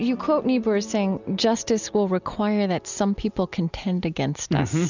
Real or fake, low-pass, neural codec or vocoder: real; 7.2 kHz; none